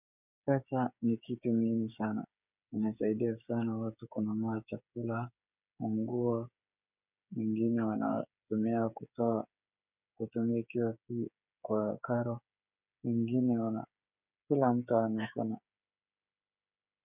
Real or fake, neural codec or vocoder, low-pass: fake; codec, 16 kHz, 8 kbps, FreqCodec, smaller model; 3.6 kHz